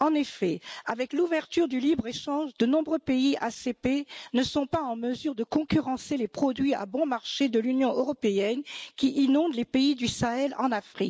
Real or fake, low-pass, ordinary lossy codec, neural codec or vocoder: real; none; none; none